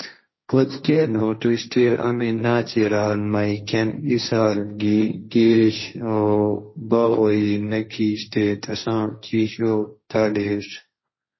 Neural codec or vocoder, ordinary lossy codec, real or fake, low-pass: codec, 16 kHz, 1.1 kbps, Voila-Tokenizer; MP3, 24 kbps; fake; 7.2 kHz